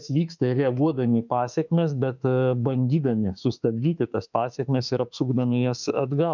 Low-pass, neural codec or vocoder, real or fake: 7.2 kHz; autoencoder, 48 kHz, 32 numbers a frame, DAC-VAE, trained on Japanese speech; fake